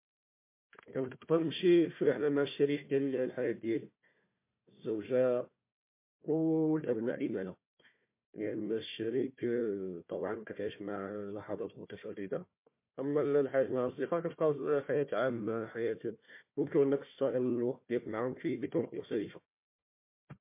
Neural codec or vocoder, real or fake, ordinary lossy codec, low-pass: codec, 16 kHz, 1 kbps, FunCodec, trained on Chinese and English, 50 frames a second; fake; MP3, 24 kbps; 3.6 kHz